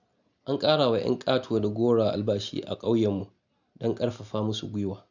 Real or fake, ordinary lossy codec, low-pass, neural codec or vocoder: real; none; 7.2 kHz; none